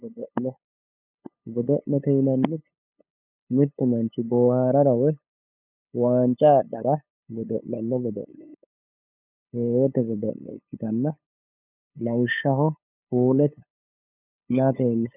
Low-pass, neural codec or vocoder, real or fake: 3.6 kHz; codec, 16 kHz, 8 kbps, FunCodec, trained on LibriTTS, 25 frames a second; fake